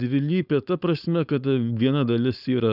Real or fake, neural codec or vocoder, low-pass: fake; codec, 16 kHz, 4.8 kbps, FACodec; 5.4 kHz